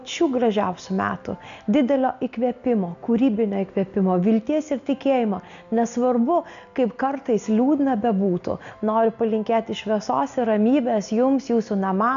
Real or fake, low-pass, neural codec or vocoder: real; 7.2 kHz; none